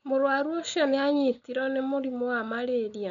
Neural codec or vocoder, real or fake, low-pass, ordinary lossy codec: none; real; 7.2 kHz; none